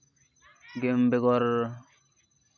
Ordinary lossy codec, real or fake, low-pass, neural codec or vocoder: none; real; 7.2 kHz; none